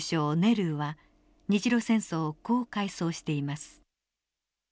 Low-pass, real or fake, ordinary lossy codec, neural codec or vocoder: none; real; none; none